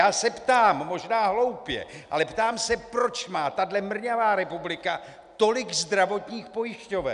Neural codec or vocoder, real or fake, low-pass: none; real; 10.8 kHz